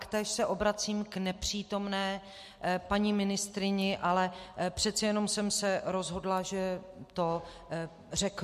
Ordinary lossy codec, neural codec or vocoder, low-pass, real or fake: MP3, 64 kbps; none; 14.4 kHz; real